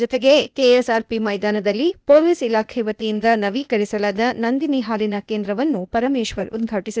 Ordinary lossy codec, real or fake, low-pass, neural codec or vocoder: none; fake; none; codec, 16 kHz, 0.8 kbps, ZipCodec